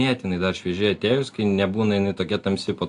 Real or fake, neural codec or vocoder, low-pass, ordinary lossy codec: real; none; 10.8 kHz; AAC, 64 kbps